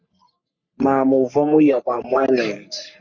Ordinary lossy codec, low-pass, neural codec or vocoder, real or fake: Opus, 64 kbps; 7.2 kHz; codec, 44.1 kHz, 3.4 kbps, Pupu-Codec; fake